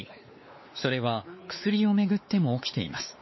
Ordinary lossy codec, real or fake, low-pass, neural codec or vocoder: MP3, 24 kbps; fake; 7.2 kHz; codec, 16 kHz, 4 kbps, X-Codec, WavLM features, trained on Multilingual LibriSpeech